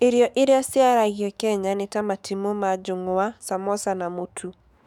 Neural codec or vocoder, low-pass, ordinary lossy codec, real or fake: autoencoder, 48 kHz, 128 numbers a frame, DAC-VAE, trained on Japanese speech; 19.8 kHz; none; fake